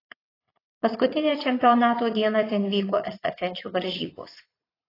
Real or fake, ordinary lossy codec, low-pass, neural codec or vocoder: fake; AAC, 24 kbps; 5.4 kHz; vocoder, 44.1 kHz, 80 mel bands, Vocos